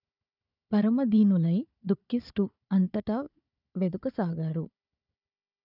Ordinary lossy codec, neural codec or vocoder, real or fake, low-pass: none; codec, 16 kHz, 4 kbps, FunCodec, trained on Chinese and English, 50 frames a second; fake; 5.4 kHz